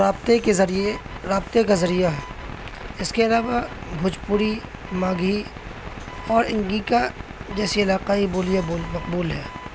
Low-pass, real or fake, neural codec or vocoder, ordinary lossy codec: none; real; none; none